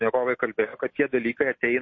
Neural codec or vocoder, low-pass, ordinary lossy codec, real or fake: none; 7.2 kHz; MP3, 32 kbps; real